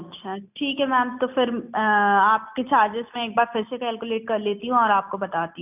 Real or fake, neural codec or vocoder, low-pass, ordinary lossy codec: real; none; 3.6 kHz; none